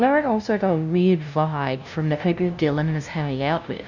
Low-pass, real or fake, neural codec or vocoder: 7.2 kHz; fake; codec, 16 kHz, 0.5 kbps, FunCodec, trained on LibriTTS, 25 frames a second